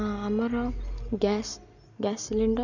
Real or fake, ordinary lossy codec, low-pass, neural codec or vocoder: real; none; 7.2 kHz; none